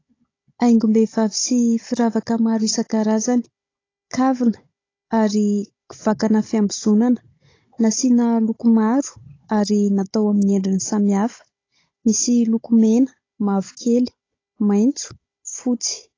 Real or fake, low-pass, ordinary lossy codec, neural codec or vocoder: fake; 7.2 kHz; AAC, 32 kbps; codec, 16 kHz, 16 kbps, FunCodec, trained on Chinese and English, 50 frames a second